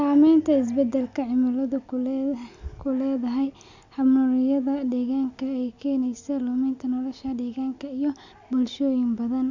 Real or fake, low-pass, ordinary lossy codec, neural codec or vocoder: real; 7.2 kHz; none; none